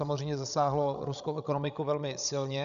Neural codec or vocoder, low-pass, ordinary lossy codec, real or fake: codec, 16 kHz, 16 kbps, FreqCodec, larger model; 7.2 kHz; MP3, 96 kbps; fake